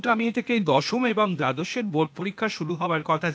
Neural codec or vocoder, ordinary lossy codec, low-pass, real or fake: codec, 16 kHz, 0.8 kbps, ZipCodec; none; none; fake